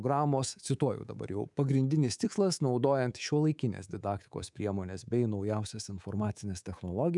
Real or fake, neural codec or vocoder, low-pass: fake; codec, 24 kHz, 3.1 kbps, DualCodec; 10.8 kHz